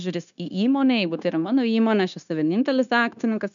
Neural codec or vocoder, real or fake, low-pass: codec, 16 kHz, 0.9 kbps, LongCat-Audio-Codec; fake; 7.2 kHz